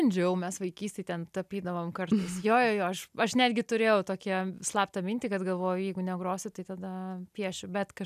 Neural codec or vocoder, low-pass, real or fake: none; 14.4 kHz; real